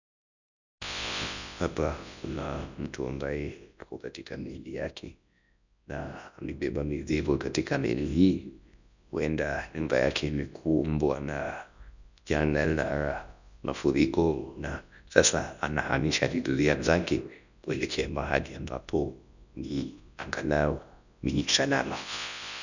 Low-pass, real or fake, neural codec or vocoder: 7.2 kHz; fake; codec, 24 kHz, 0.9 kbps, WavTokenizer, large speech release